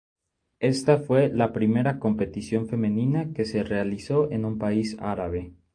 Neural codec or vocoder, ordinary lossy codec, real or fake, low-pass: none; AAC, 48 kbps; real; 9.9 kHz